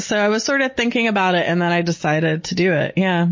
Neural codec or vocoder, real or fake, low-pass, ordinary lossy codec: none; real; 7.2 kHz; MP3, 32 kbps